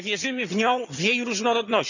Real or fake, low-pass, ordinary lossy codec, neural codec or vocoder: fake; 7.2 kHz; none; vocoder, 22.05 kHz, 80 mel bands, HiFi-GAN